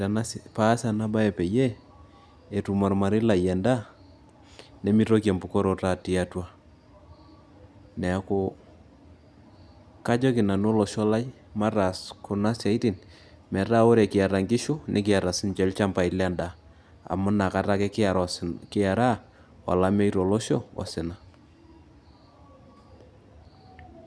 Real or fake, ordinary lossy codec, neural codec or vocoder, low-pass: real; none; none; none